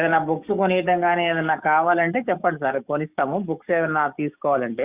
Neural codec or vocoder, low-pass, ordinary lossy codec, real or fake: codec, 44.1 kHz, 7.8 kbps, DAC; 3.6 kHz; Opus, 64 kbps; fake